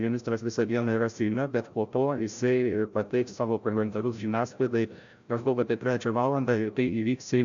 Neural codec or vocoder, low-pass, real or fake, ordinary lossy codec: codec, 16 kHz, 0.5 kbps, FreqCodec, larger model; 7.2 kHz; fake; AAC, 64 kbps